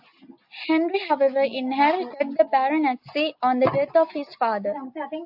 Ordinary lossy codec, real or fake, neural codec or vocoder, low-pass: AAC, 48 kbps; real; none; 5.4 kHz